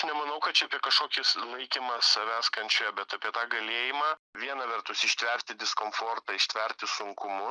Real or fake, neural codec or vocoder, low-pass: real; none; 9.9 kHz